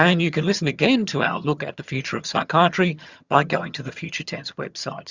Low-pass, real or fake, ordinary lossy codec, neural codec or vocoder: 7.2 kHz; fake; Opus, 64 kbps; vocoder, 22.05 kHz, 80 mel bands, HiFi-GAN